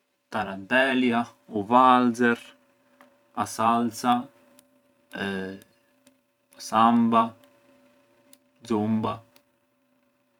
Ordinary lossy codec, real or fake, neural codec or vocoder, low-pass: none; fake; vocoder, 44.1 kHz, 128 mel bands every 512 samples, BigVGAN v2; 19.8 kHz